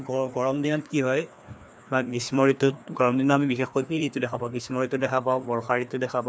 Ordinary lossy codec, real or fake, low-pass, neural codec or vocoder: none; fake; none; codec, 16 kHz, 2 kbps, FreqCodec, larger model